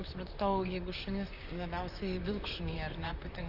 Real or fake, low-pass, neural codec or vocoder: fake; 5.4 kHz; vocoder, 44.1 kHz, 128 mel bands, Pupu-Vocoder